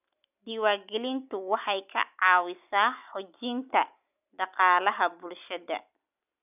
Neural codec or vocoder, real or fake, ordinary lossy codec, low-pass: none; real; none; 3.6 kHz